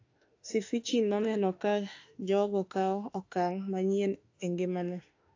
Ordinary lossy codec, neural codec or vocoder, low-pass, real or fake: AAC, 48 kbps; autoencoder, 48 kHz, 32 numbers a frame, DAC-VAE, trained on Japanese speech; 7.2 kHz; fake